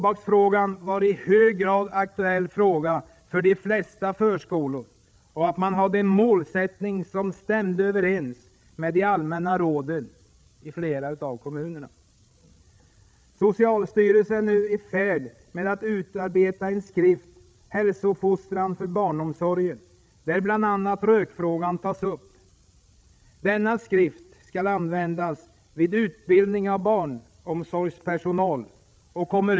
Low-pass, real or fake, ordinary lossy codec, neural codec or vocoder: none; fake; none; codec, 16 kHz, 8 kbps, FreqCodec, larger model